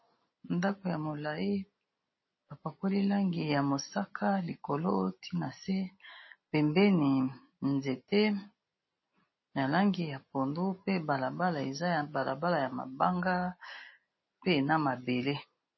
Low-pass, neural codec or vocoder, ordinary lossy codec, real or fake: 7.2 kHz; none; MP3, 24 kbps; real